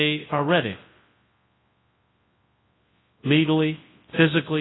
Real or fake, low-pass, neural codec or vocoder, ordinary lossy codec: fake; 7.2 kHz; codec, 24 kHz, 0.9 kbps, WavTokenizer, large speech release; AAC, 16 kbps